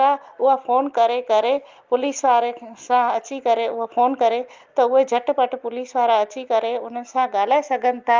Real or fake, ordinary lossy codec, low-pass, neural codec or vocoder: real; Opus, 32 kbps; 7.2 kHz; none